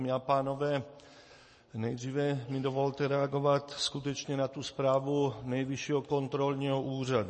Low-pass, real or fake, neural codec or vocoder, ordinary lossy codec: 10.8 kHz; real; none; MP3, 32 kbps